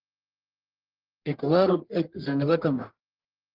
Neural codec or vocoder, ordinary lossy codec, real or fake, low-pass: codec, 44.1 kHz, 1.7 kbps, Pupu-Codec; Opus, 16 kbps; fake; 5.4 kHz